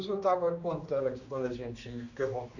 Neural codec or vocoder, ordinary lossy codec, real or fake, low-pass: codec, 16 kHz, 2 kbps, X-Codec, HuBERT features, trained on general audio; none; fake; 7.2 kHz